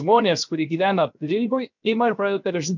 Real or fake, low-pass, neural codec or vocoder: fake; 7.2 kHz; codec, 16 kHz, 0.7 kbps, FocalCodec